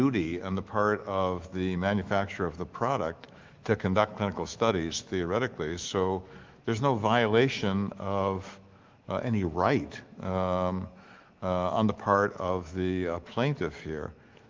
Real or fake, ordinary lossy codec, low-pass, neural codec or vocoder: fake; Opus, 32 kbps; 7.2 kHz; autoencoder, 48 kHz, 128 numbers a frame, DAC-VAE, trained on Japanese speech